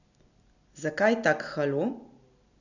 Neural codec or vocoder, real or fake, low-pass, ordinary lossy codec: none; real; 7.2 kHz; none